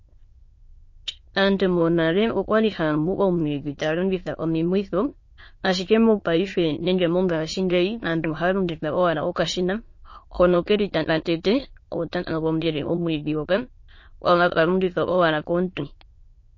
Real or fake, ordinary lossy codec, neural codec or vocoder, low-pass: fake; MP3, 32 kbps; autoencoder, 22.05 kHz, a latent of 192 numbers a frame, VITS, trained on many speakers; 7.2 kHz